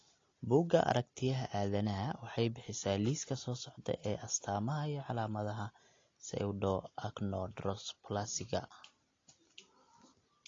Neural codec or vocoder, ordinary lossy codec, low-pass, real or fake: none; AAC, 32 kbps; 7.2 kHz; real